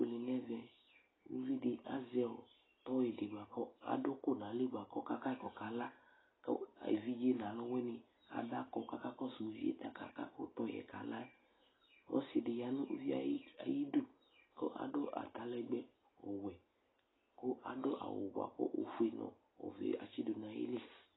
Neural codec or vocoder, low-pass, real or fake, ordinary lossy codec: none; 7.2 kHz; real; AAC, 16 kbps